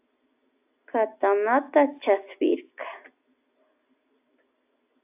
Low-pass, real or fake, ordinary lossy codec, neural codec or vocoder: 3.6 kHz; real; AAC, 32 kbps; none